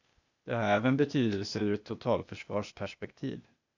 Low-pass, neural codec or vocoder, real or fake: 7.2 kHz; codec, 16 kHz, 0.8 kbps, ZipCodec; fake